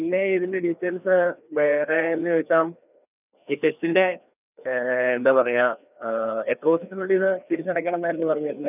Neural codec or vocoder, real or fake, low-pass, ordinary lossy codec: codec, 16 kHz, 2 kbps, FreqCodec, larger model; fake; 3.6 kHz; none